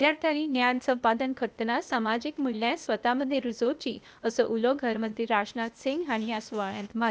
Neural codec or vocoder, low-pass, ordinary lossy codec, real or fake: codec, 16 kHz, 0.8 kbps, ZipCodec; none; none; fake